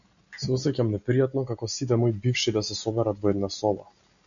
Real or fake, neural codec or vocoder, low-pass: real; none; 7.2 kHz